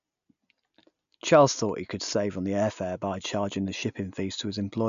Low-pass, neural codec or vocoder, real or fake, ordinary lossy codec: 7.2 kHz; none; real; none